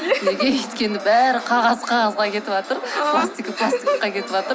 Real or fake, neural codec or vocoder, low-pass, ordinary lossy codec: real; none; none; none